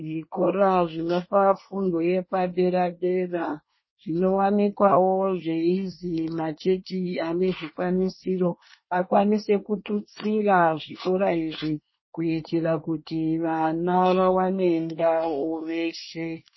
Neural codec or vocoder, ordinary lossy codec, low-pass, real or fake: codec, 24 kHz, 1 kbps, SNAC; MP3, 24 kbps; 7.2 kHz; fake